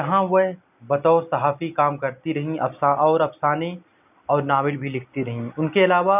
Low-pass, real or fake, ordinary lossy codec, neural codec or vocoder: 3.6 kHz; real; none; none